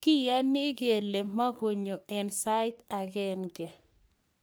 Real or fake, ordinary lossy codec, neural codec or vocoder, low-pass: fake; none; codec, 44.1 kHz, 3.4 kbps, Pupu-Codec; none